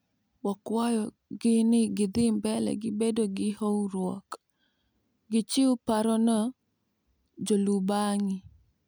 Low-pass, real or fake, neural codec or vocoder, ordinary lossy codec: none; real; none; none